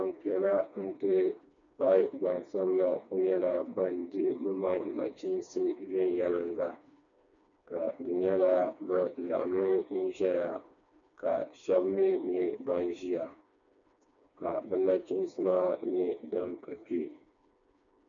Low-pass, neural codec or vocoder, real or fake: 7.2 kHz; codec, 16 kHz, 2 kbps, FreqCodec, smaller model; fake